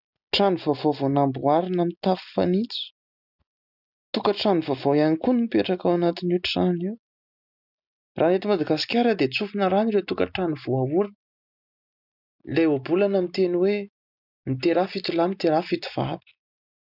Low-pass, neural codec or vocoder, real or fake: 5.4 kHz; none; real